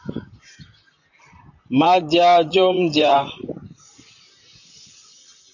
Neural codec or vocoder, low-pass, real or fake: vocoder, 44.1 kHz, 128 mel bands, Pupu-Vocoder; 7.2 kHz; fake